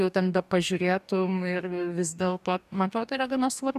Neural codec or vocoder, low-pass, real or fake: codec, 44.1 kHz, 2.6 kbps, DAC; 14.4 kHz; fake